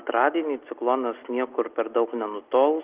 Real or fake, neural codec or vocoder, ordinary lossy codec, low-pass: real; none; Opus, 24 kbps; 3.6 kHz